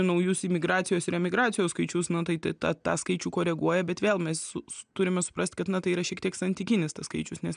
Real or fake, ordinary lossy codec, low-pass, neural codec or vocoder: real; MP3, 96 kbps; 9.9 kHz; none